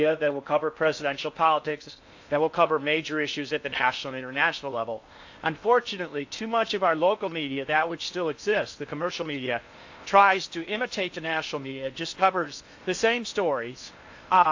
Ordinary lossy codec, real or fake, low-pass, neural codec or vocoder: AAC, 48 kbps; fake; 7.2 kHz; codec, 16 kHz in and 24 kHz out, 0.6 kbps, FocalCodec, streaming, 4096 codes